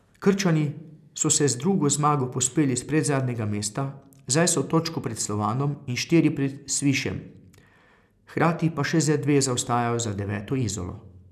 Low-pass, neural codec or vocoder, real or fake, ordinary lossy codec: 14.4 kHz; none; real; none